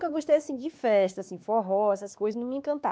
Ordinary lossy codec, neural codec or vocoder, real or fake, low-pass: none; codec, 16 kHz, 2 kbps, X-Codec, WavLM features, trained on Multilingual LibriSpeech; fake; none